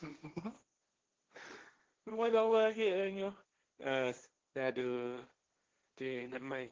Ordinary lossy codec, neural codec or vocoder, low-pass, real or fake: Opus, 16 kbps; codec, 16 kHz, 1.1 kbps, Voila-Tokenizer; 7.2 kHz; fake